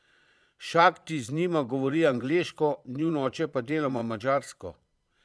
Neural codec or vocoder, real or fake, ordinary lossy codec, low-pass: vocoder, 22.05 kHz, 80 mel bands, Vocos; fake; none; none